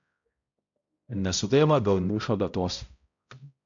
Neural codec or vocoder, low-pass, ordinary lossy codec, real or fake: codec, 16 kHz, 0.5 kbps, X-Codec, HuBERT features, trained on balanced general audio; 7.2 kHz; MP3, 64 kbps; fake